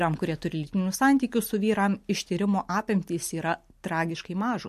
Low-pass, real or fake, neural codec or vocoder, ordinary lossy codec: 14.4 kHz; real; none; MP3, 64 kbps